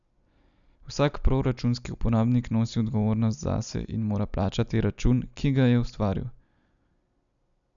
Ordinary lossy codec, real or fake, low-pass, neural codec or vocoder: none; real; 7.2 kHz; none